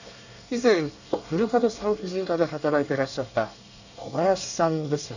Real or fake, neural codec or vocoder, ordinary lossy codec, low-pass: fake; codec, 24 kHz, 1 kbps, SNAC; none; 7.2 kHz